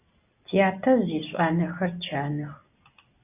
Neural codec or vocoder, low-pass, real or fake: none; 3.6 kHz; real